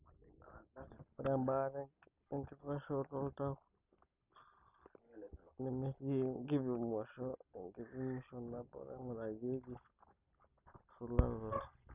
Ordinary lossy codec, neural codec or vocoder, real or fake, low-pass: none; none; real; 3.6 kHz